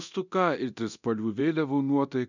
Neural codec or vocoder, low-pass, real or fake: codec, 24 kHz, 0.9 kbps, DualCodec; 7.2 kHz; fake